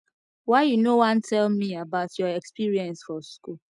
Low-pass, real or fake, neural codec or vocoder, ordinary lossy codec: 10.8 kHz; real; none; none